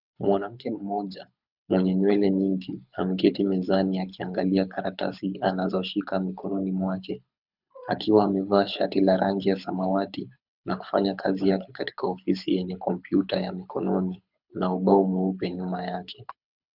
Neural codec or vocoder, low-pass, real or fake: codec, 24 kHz, 6 kbps, HILCodec; 5.4 kHz; fake